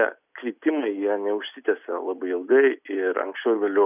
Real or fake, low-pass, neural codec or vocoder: real; 3.6 kHz; none